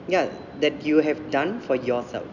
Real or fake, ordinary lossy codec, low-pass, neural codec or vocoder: real; none; 7.2 kHz; none